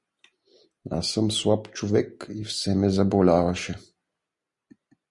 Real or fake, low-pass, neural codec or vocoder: real; 10.8 kHz; none